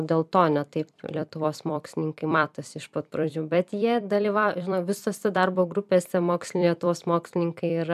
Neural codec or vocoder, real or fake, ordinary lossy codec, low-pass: vocoder, 44.1 kHz, 128 mel bands every 256 samples, BigVGAN v2; fake; MP3, 96 kbps; 14.4 kHz